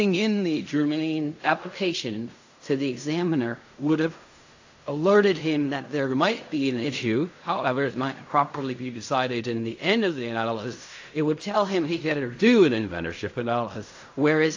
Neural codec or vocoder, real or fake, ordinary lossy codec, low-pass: codec, 16 kHz in and 24 kHz out, 0.4 kbps, LongCat-Audio-Codec, fine tuned four codebook decoder; fake; AAC, 48 kbps; 7.2 kHz